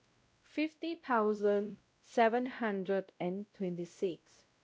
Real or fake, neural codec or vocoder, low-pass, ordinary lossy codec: fake; codec, 16 kHz, 0.5 kbps, X-Codec, WavLM features, trained on Multilingual LibriSpeech; none; none